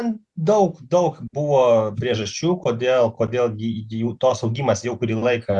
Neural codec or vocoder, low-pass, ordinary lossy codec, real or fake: none; 10.8 kHz; Opus, 64 kbps; real